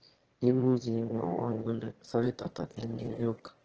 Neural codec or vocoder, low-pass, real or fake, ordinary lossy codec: autoencoder, 22.05 kHz, a latent of 192 numbers a frame, VITS, trained on one speaker; 7.2 kHz; fake; Opus, 16 kbps